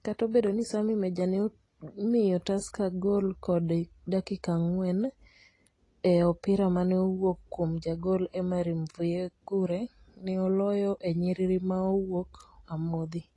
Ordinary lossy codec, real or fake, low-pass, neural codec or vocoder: AAC, 32 kbps; real; 10.8 kHz; none